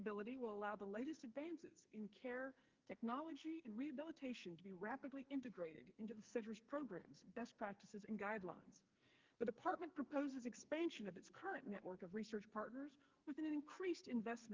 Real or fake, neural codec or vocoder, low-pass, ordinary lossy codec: fake; codec, 44.1 kHz, 2.6 kbps, SNAC; 7.2 kHz; Opus, 32 kbps